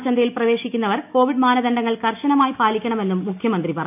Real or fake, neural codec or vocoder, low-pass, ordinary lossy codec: real; none; 3.6 kHz; none